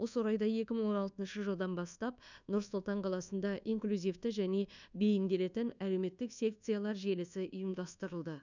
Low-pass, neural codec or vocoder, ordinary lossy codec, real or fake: 7.2 kHz; codec, 24 kHz, 1.2 kbps, DualCodec; none; fake